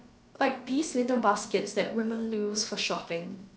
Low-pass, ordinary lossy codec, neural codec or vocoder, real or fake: none; none; codec, 16 kHz, about 1 kbps, DyCAST, with the encoder's durations; fake